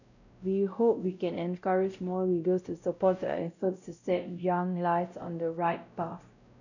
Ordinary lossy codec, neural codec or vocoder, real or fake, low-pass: none; codec, 16 kHz, 0.5 kbps, X-Codec, WavLM features, trained on Multilingual LibriSpeech; fake; 7.2 kHz